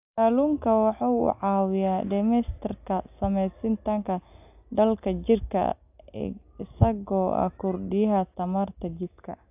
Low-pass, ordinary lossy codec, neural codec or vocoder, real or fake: 3.6 kHz; AAC, 32 kbps; none; real